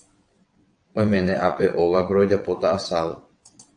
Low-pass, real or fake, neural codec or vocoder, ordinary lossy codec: 9.9 kHz; fake; vocoder, 22.05 kHz, 80 mel bands, WaveNeXt; AAC, 64 kbps